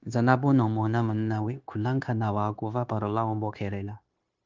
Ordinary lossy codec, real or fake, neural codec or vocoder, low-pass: Opus, 32 kbps; fake; codec, 16 kHz, 0.9 kbps, LongCat-Audio-Codec; 7.2 kHz